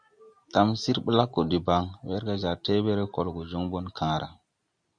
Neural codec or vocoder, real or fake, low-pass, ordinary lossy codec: none; real; 9.9 kHz; Opus, 64 kbps